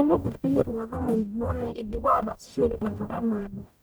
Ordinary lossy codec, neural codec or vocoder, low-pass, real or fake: none; codec, 44.1 kHz, 0.9 kbps, DAC; none; fake